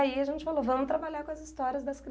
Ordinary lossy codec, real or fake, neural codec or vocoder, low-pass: none; real; none; none